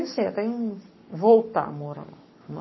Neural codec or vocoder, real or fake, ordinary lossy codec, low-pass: codec, 44.1 kHz, 7.8 kbps, Pupu-Codec; fake; MP3, 24 kbps; 7.2 kHz